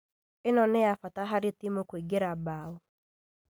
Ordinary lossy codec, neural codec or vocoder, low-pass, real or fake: none; none; none; real